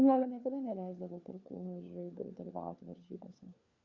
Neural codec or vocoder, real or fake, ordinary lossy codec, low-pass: codec, 16 kHz, 4 kbps, FunCodec, trained on LibriTTS, 50 frames a second; fake; Opus, 64 kbps; 7.2 kHz